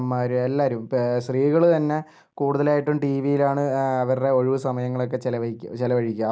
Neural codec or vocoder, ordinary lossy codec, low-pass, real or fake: none; none; none; real